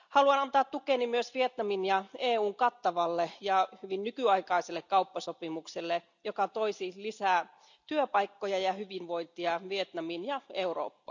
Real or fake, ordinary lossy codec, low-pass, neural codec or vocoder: real; none; 7.2 kHz; none